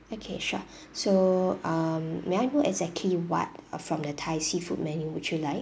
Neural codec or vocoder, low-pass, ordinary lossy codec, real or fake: none; none; none; real